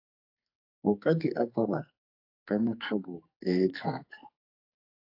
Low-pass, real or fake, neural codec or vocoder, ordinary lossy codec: 5.4 kHz; fake; codec, 44.1 kHz, 2.6 kbps, SNAC; AAC, 32 kbps